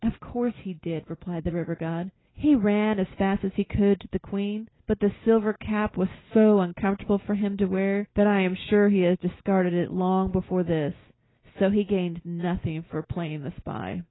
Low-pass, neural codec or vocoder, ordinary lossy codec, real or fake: 7.2 kHz; none; AAC, 16 kbps; real